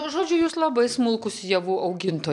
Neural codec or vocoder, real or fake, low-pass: none; real; 10.8 kHz